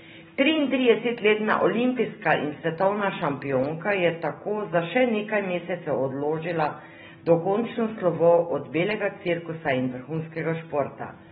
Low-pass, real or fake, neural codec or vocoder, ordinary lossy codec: 9.9 kHz; real; none; AAC, 16 kbps